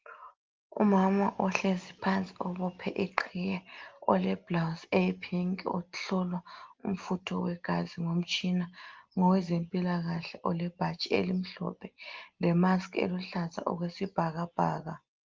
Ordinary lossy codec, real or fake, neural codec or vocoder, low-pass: Opus, 32 kbps; real; none; 7.2 kHz